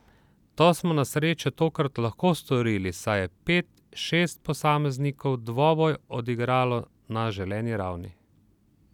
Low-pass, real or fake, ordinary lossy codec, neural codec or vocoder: 19.8 kHz; real; none; none